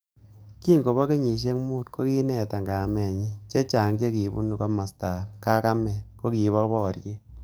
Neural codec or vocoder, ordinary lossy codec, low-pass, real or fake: codec, 44.1 kHz, 7.8 kbps, DAC; none; none; fake